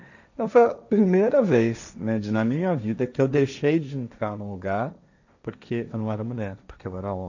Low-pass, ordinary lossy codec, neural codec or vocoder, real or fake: 7.2 kHz; none; codec, 16 kHz, 1.1 kbps, Voila-Tokenizer; fake